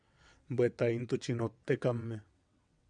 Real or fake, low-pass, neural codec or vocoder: fake; 9.9 kHz; vocoder, 22.05 kHz, 80 mel bands, WaveNeXt